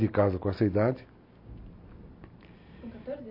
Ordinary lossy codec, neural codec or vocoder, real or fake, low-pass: MP3, 32 kbps; none; real; 5.4 kHz